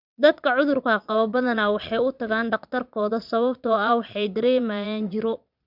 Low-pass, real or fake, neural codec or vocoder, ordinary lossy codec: 5.4 kHz; fake; vocoder, 22.05 kHz, 80 mel bands, Vocos; none